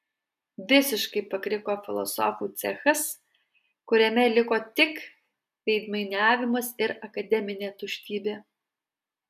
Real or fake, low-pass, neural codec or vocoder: real; 14.4 kHz; none